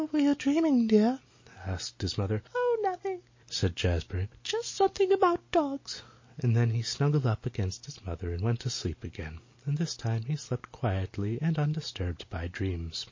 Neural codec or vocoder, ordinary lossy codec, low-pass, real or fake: none; MP3, 32 kbps; 7.2 kHz; real